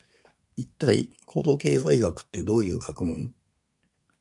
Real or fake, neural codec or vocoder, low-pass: fake; codec, 24 kHz, 1 kbps, SNAC; 10.8 kHz